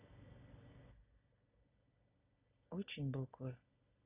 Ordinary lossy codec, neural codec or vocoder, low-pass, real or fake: none; none; 3.6 kHz; real